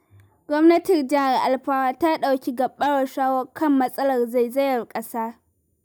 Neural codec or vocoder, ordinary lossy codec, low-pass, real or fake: none; none; none; real